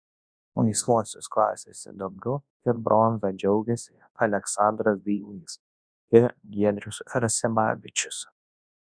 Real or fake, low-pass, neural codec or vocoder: fake; 9.9 kHz; codec, 24 kHz, 0.9 kbps, WavTokenizer, large speech release